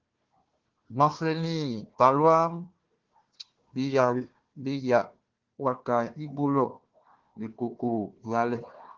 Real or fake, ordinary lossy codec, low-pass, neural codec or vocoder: fake; Opus, 16 kbps; 7.2 kHz; codec, 16 kHz, 1 kbps, FunCodec, trained on Chinese and English, 50 frames a second